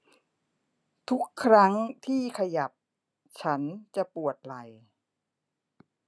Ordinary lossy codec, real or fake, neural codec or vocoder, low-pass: none; real; none; none